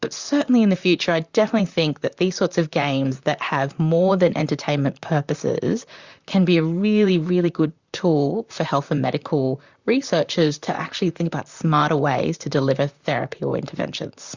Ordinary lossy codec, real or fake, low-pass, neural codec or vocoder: Opus, 64 kbps; fake; 7.2 kHz; vocoder, 44.1 kHz, 128 mel bands, Pupu-Vocoder